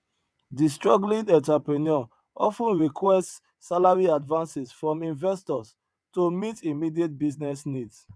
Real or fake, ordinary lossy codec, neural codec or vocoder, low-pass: fake; none; vocoder, 22.05 kHz, 80 mel bands, WaveNeXt; none